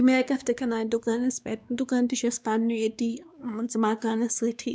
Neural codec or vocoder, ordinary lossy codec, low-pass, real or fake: codec, 16 kHz, 2 kbps, X-Codec, WavLM features, trained on Multilingual LibriSpeech; none; none; fake